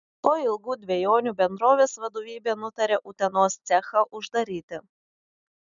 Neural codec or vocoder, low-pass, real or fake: none; 7.2 kHz; real